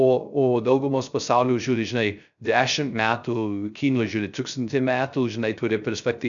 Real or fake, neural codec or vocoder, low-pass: fake; codec, 16 kHz, 0.3 kbps, FocalCodec; 7.2 kHz